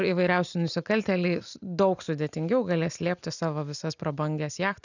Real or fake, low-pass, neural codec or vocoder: real; 7.2 kHz; none